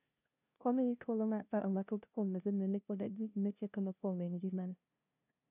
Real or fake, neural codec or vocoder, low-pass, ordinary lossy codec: fake; codec, 16 kHz, 0.5 kbps, FunCodec, trained on LibriTTS, 25 frames a second; 3.6 kHz; none